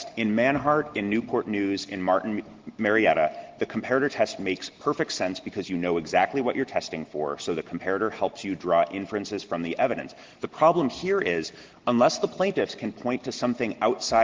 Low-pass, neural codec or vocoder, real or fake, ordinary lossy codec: 7.2 kHz; none; real; Opus, 16 kbps